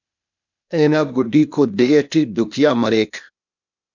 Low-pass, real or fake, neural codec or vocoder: 7.2 kHz; fake; codec, 16 kHz, 0.8 kbps, ZipCodec